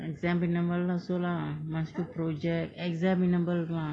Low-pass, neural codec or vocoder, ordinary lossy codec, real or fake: 9.9 kHz; none; AAC, 32 kbps; real